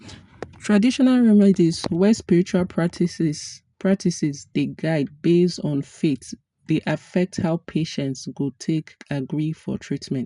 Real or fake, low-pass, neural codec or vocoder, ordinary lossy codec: real; 10.8 kHz; none; none